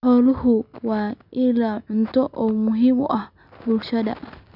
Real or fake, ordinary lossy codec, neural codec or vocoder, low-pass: real; none; none; 5.4 kHz